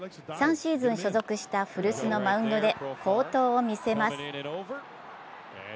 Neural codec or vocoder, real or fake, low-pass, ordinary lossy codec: none; real; none; none